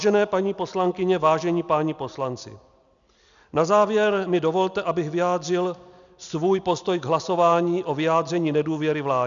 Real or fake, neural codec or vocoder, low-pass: real; none; 7.2 kHz